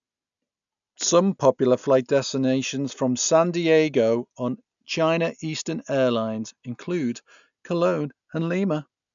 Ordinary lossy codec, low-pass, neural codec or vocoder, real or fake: none; 7.2 kHz; none; real